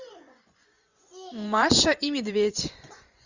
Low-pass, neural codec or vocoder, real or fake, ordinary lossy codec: 7.2 kHz; vocoder, 24 kHz, 100 mel bands, Vocos; fake; Opus, 64 kbps